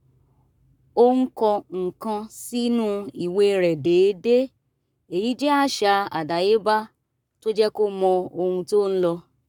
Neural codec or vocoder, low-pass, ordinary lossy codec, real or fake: codec, 44.1 kHz, 7.8 kbps, Pupu-Codec; 19.8 kHz; none; fake